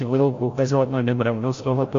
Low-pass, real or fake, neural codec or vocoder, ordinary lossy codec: 7.2 kHz; fake; codec, 16 kHz, 0.5 kbps, FreqCodec, larger model; AAC, 48 kbps